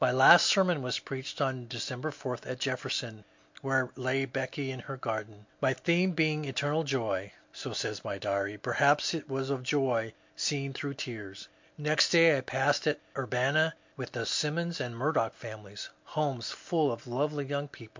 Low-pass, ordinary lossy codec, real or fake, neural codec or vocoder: 7.2 kHz; MP3, 48 kbps; real; none